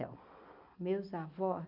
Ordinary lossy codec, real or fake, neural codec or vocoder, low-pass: none; fake; vocoder, 44.1 kHz, 128 mel bands every 256 samples, BigVGAN v2; 5.4 kHz